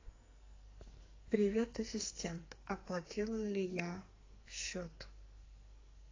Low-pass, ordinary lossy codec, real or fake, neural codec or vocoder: 7.2 kHz; AAC, 32 kbps; fake; codec, 44.1 kHz, 2.6 kbps, SNAC